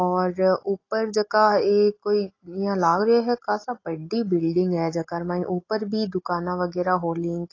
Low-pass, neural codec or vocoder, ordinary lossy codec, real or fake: 7.2 kHz; none; AAC, 32 kbps; real